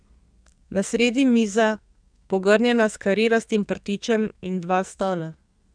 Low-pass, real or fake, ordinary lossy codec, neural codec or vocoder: 9.9 kHz; fake; none; codec, 44.1 kHz, 2.6 kbps, SNAC